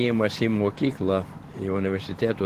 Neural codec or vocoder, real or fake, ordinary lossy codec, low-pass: vocoder, 44.1 kHz, 128 mel bands every 512 samples, BigVGAN v2; fake; Opus, 16 kbps; 14.4 kHz